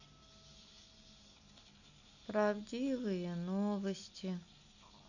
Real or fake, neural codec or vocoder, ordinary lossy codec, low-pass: real; none; none; 7.2 kHz